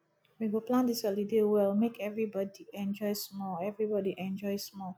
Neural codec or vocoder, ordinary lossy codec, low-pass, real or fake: none; none; none; real